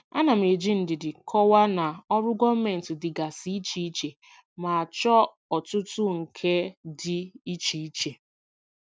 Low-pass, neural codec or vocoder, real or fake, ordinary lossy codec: none; none; real; none